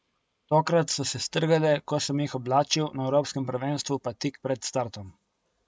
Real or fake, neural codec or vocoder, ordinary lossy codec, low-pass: real; none; none; none